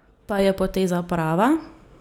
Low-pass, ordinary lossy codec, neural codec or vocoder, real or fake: 19.8 kHz; none; none; real